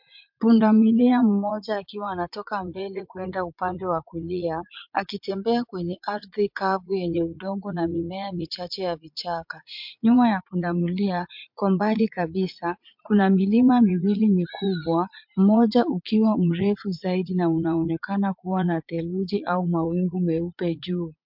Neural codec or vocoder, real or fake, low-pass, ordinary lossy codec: vocoder, 44.1 kHz, 80 mel bands, Vocos; fake; 5.4 kHz; MP3, 48 kbps